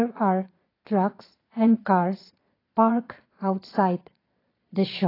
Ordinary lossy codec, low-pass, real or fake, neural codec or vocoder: AAC, 24 kbps; 5.4 kHz; fake; vocoder, 22.05 kHz, 80 mel bands, Vocos